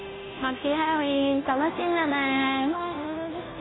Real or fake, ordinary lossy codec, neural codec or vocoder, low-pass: fake; AAC, 16 kbps; codec, 16 kHz, 0.5 kbps, FunCodec, trained on Chinese and English, 25 frames a second; 7.2 kHz